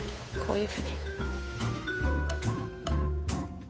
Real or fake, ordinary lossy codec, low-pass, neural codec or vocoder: fake; none; none; codec, 16 kHz, 8 kbps, FunCodec, trained on Chinese and English, 25 frames a second